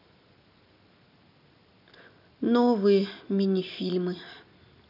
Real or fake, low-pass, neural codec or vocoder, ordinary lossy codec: real; 5.4 kHz; none; none